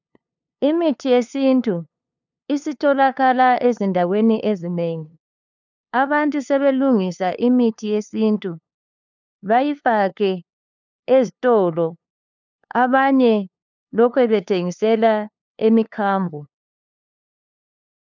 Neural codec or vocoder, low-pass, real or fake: codec, 16 kHz, 2 kbps, FunCodec, trained on LibriTTS, 25 frames a second; 7.2 kHz; fake